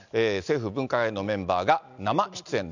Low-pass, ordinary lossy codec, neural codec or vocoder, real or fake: 7.2 kHz; none; none; real